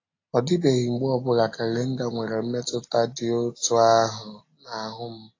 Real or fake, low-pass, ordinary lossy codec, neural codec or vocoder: real; 7.2 kHz; AAC, 32 kbps; none